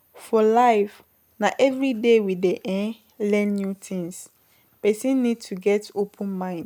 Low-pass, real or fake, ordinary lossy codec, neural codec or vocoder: 19.8 kHz; real; none; none